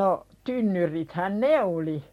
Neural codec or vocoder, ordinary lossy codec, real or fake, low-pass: none; MP3, 64 kbps; real; 19.8 kHz